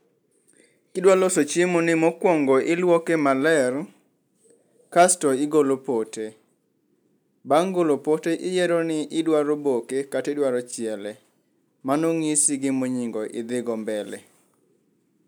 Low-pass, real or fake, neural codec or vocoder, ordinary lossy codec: none; real; none; none